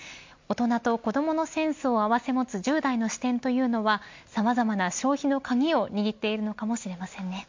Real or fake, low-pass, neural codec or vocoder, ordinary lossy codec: real; 7.2 kHz; none; MP3, 48 kbps